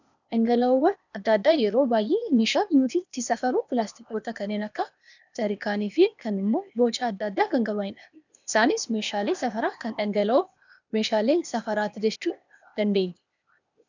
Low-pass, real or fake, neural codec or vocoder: 7.2 kHz; fake; codec, 16 kHz, 0.8 kbps, ZipCodec